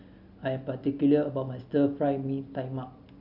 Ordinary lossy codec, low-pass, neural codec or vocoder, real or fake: none; 5.4 kHz; none; real